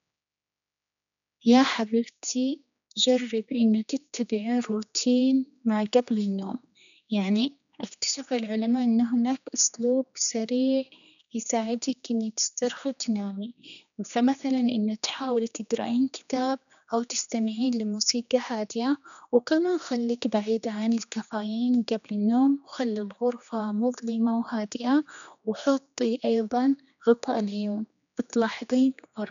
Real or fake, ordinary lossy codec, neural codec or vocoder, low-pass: fake; none; codec, 16 kHz, 2 kbps, X-Codec, HuBERT features, trained on general audio; 7.2 kHz